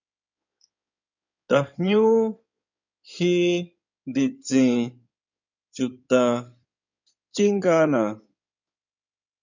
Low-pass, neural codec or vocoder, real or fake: 7.2 kHz; codec, 16 kHz in and 24 kHz out, 2.2 kbps, FireRedTTS-2 codec; fake